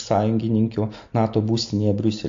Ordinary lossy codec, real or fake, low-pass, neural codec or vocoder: AAC, 48 kbps; real; 7.2 kHz; none